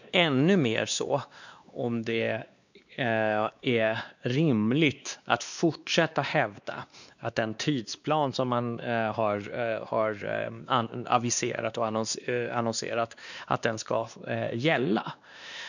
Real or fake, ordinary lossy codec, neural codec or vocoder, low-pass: fake; none; codec, 16 kHz, 2 kbps, X-Codec, WavLM features, trained on Multilingual LibriSpeech; 7.2 kHz